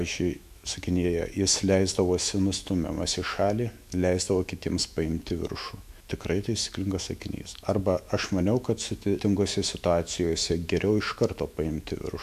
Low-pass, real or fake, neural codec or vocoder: 14.4 kHz; fake; autoencoder, 48 kHz, 128 numbers a frame, DAC-VAE, trained on Japanese speech